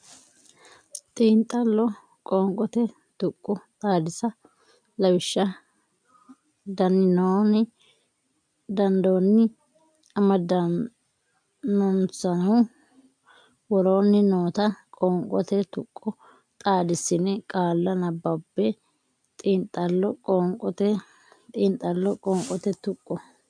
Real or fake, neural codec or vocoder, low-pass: real; none; 9.9 kHz